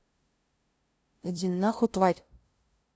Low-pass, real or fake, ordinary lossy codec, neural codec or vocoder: none; fake; none; codec, 16 kHz, 0.5 kbps, FunCodec, trained on LibriTTS, 25 frames a second